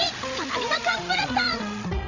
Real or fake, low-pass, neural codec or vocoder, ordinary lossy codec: fake; 7.2 kHz; codec, 16 kHz, 16 kbps, FreqCodec, larger model; none